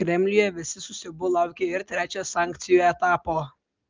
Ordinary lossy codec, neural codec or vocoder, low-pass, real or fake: Opus, 24 kbps; none; 7.2 kHz; real